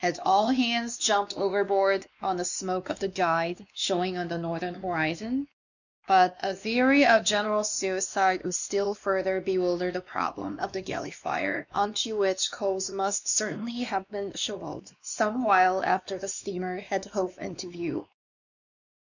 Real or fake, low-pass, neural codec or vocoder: fake; 7.2 kHz; codec, 16 kHz, 2 kbps, X-Codec, WavLM features, trained on Multilingual LibriSpeech